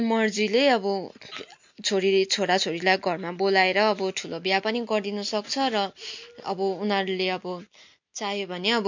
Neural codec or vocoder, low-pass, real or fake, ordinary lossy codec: none; 7.2 kHz; real; MP3, 48 kbps